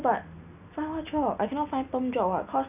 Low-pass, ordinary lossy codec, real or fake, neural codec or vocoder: 3.6 kHz; none; real; none